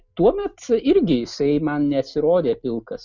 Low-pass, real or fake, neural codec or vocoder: 7.2 kHz; real; none